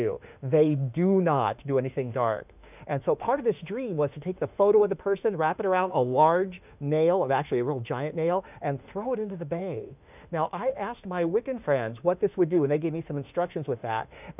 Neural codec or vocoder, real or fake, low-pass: autoencoder, 48 kHz, 32 numbers a frame, DAC-VAE, trained on Japanese speech; fake; 3.6 kHz